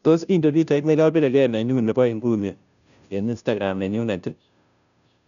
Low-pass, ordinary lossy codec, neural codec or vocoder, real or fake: 7.2 kHz; none; codec, 16 kHz, 0.5 kbps, FunCodec, trained on Chinese and English, 25 frames a second; fake